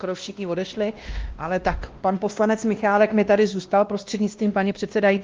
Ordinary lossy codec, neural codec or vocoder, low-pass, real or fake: Opus, 32 kbps; codec, 16 kHz, 1 kbps, X-Codec, WavLM features, trained on Multilingual LibriSpeech; 7.2 kHz; fake